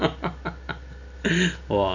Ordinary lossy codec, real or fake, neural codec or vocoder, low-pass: none; real; none; 7.2 kHz